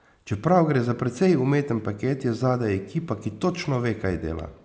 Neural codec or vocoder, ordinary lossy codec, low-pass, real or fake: none; none; none; real